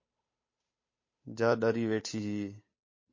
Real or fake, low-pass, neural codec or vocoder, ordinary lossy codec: fake; 7.2 kHz; codec, 16 kHz, 8 kbps, FunCodec, trained on Chinese and English, 25 frames a second; MP3, 32 kbps